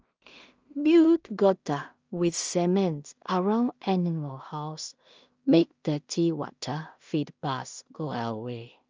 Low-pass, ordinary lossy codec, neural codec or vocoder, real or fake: 7.2 kHz; Opus, 24 kbps; codec, 16 kHz in and 24 kHz out, 0.4 kbps, LongCat-Audio-Codec, two codebook decoder; fake